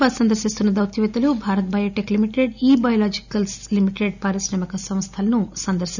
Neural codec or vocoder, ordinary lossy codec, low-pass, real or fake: none; none; 7.2 kHz; real